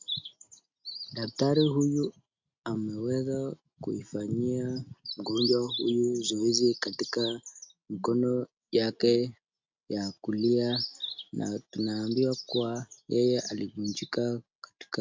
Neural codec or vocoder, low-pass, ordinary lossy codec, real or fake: none; 7.2 kHz; MP3, 64 kbps; real